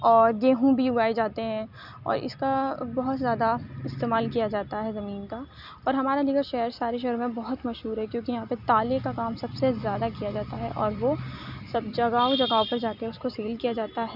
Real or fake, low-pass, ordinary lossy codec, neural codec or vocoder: real; 5.4 kHz; none; none